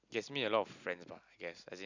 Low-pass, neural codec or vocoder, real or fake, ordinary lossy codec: 7.2 kHz; none; real; none